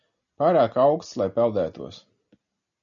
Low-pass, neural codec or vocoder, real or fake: 7.2 kHz; none; real